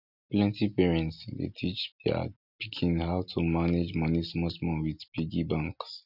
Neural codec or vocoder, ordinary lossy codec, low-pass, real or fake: none; none; 5.4 kHz; real